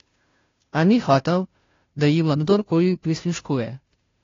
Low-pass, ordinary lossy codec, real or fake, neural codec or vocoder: 7.2 kHz; AAC, 32 kbps; fake; codec, 16 kHz, 0.5 kbps, FunCodec, trained on Chinese and English, 25 frames a second